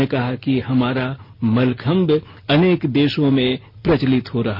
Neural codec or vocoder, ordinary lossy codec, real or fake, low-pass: none; none; real; 5.4 kHz